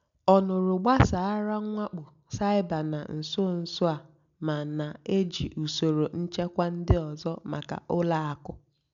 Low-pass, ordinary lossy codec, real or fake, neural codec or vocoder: 7.2 kHz; MP3, 96 kbps; real; none